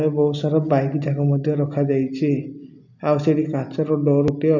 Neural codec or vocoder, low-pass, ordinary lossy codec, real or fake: none; 7.2 kHz; none; real